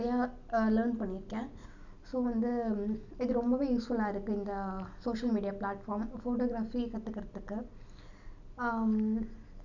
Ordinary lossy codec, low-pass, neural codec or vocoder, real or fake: none; 7.2 kHz; none; real